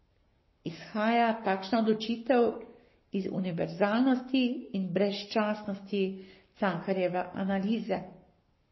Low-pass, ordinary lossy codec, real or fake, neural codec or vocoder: 7.2 kHz; MP3, 24 kbps; fake; codec, 44.1 kHz, 7.8 kbps, Pupu-Codec